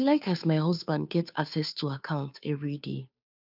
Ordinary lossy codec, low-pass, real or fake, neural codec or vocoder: none; 5.4 kHz; fake; codec, 16 kHz, 2 kbps, FunCodec, trained on Chinese and English, 25 frames a second